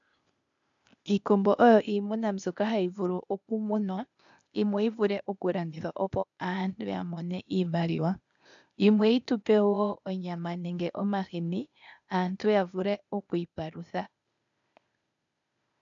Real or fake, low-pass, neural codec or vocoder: fake; 7.2 kHz; codec, 16 kHz, 0.8 kbps, ZipCodec